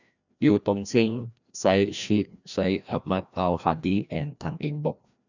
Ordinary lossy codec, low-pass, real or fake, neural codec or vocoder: none; 7.2 kHz; fake; codec, 16 kHz, 1 kbps, FreqCodec, larger model